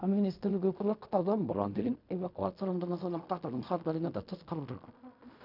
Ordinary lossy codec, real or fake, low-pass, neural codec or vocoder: none; fake; 5.4 kHz; codec, 16 kHz in and 24 kHz out, 0.4 kbps, LongCat-Audio-Codec, fine tuned four codebook decoder